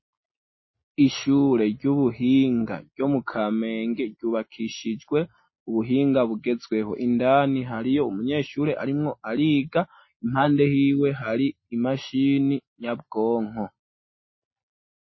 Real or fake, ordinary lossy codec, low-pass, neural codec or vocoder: real; MP3, 24 kbps; 7.2 kHz; none